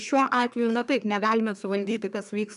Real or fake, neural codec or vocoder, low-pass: fake; codec, 24 kHz, 1 kbps, SNAC; 10.8 kHz